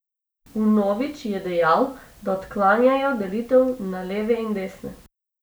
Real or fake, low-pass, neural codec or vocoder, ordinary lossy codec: real; none; none; none